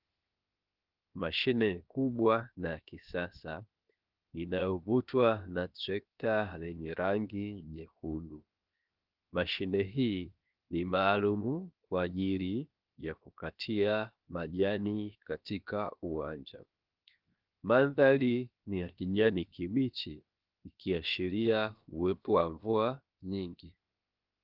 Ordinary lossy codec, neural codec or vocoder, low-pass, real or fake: Opus, 32 kbps; codec, 16 kHz, 0.7 kbps, FocalCodec; 5.4 kHz; fake